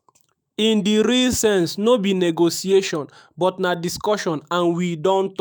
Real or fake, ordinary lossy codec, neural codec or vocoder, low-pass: fake; none; autoencoder, 48 kHz, 128 numbers a frame, DAC-VAE, trained on Japanese speech; none